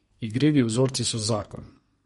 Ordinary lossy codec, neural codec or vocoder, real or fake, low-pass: MP3, 48 kbps; codec, 32 kHz, 1.9 kbps, SNAC; fake; 14.4 kHz